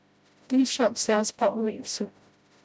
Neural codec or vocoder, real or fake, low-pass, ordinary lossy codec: codec, 16 kHz, 0.5 kbps, FreqCodec, smaller model; fake; none; none